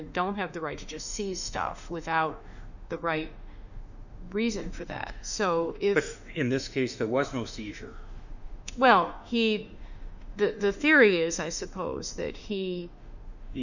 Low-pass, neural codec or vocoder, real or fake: 7.2 kHz; autoencoder, 48 kHz, 32 numbers a frame, DAC-VAE, trained on Japanese speech; fake